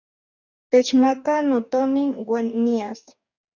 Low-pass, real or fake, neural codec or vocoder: 7.2 kHz; fake; codec, 44.1 kHz, 2.6 kbps, DAC